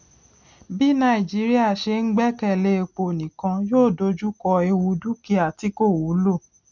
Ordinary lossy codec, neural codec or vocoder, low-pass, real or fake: none; none; 7.2 kHz; real